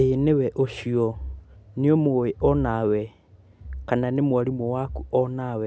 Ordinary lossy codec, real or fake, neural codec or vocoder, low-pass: none; real; none; none